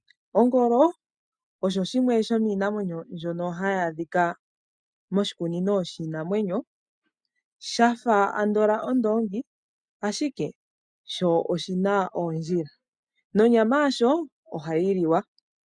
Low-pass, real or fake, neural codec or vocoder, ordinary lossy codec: 9.9 kHz; real; none; MP3, 96 kbps